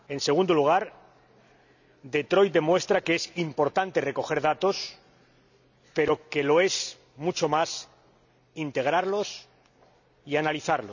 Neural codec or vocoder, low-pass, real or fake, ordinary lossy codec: none; 7.2 kHz; real; none